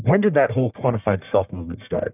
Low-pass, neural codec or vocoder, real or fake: 3.6 kHz; codec, 44.1 kHz, 1.7 kbps, Pupu-Codec; fake